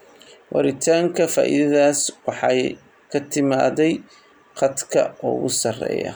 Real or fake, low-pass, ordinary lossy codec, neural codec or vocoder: real; none; none; none